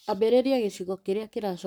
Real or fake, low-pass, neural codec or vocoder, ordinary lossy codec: fake; none; codec, 44.1 kHz, 7.8 kbps, Pupu-Codec; none